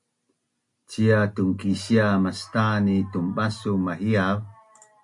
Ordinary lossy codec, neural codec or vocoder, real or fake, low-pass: MP3, 96 kbps; none; real; 10.8 kHz